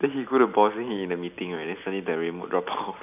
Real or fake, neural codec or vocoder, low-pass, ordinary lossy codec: real; none; 3.6 kHz; none